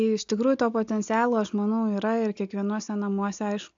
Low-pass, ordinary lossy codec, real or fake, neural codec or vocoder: 7.2 kHz; AAC, 64 kbps; real; none